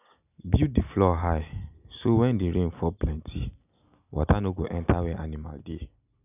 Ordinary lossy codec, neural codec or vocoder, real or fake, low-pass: none; none; real; 3.6 kHz